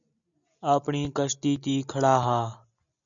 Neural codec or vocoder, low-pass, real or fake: none; 7.2 kHz; real